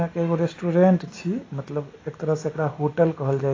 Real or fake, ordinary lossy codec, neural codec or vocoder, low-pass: real; AAC, 32 kbps; none; 7.2 kHz